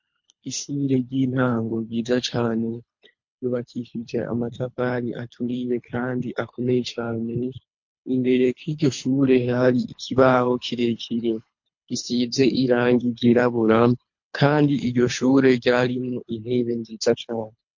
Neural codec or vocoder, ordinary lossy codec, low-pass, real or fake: codec, 24 kHz, 3 kbps, HILCodec; MP3, 48 kbps; 7.2 kHz; fake